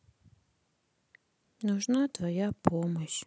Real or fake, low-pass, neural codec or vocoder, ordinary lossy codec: real; none; none; none